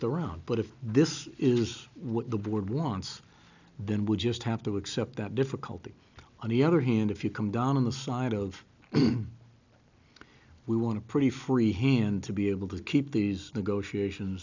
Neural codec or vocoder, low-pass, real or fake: none; 7.2 kHz; real